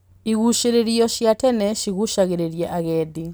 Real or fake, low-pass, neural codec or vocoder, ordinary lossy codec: real; none; none; none